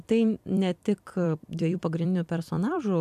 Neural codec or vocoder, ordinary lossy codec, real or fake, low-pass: vocoder, 44.1 kHz, 128 mel bands every 256 samples, BigVGAN v2; AAC, 96 kbps; fake; 14.4 kHz